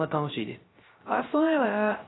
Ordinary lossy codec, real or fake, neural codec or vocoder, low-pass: AAC, 16 kbps; fake; codec, 16 kHz, 0.3 kbps, FocalCodec; 7.2 kHz